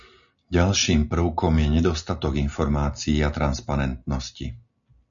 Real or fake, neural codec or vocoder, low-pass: real; none; 7.2 kHz